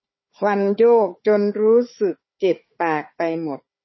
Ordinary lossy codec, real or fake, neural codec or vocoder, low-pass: MP3, 24 kbps; fake; codec, 16 kHz, 4 kbps, FunCodec, trained on Chinese and English, 50 frames a second; 7.2 kHz